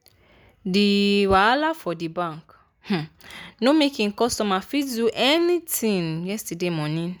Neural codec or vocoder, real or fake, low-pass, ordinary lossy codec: none; real; none; none